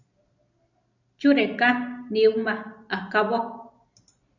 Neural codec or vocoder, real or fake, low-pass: none; real; 7.2 kHz